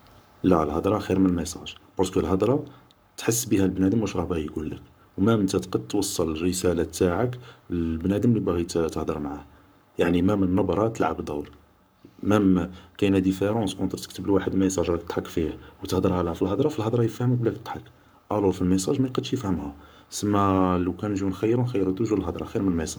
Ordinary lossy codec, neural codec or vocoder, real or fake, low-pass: none; codec, 44.1 kHz, 7.8 kbps, Pupu-Codec; fake; none